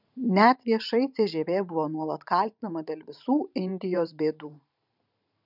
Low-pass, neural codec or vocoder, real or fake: 5.4 kHz; vocoder, 44.1 kHz, 128 mel bands every 512 samples, BigVGAN v2; fake